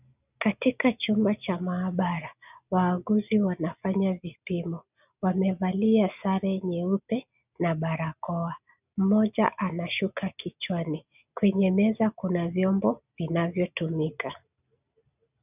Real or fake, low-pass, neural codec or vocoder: real; 3.6 kHz; none